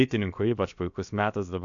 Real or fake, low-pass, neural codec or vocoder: fake; 7.2 kHz; codec, 16 kHz, about 1 kbps, DyCAST, with the encoder's durations